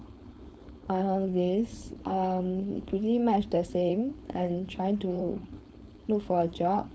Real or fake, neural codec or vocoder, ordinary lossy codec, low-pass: fake; codec, 16 kHz, 4.8 kbps, FACodec; none; none